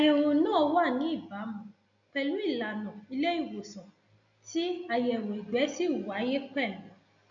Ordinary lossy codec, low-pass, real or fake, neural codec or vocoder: none; 7.2 kHz; real; none